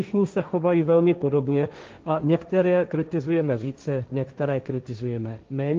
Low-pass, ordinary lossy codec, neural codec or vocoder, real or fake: 7.2 kHz; Opus, 32 kbps; codec, 16 kHz, 1.1 kbps, Voila-Tokenizer; fake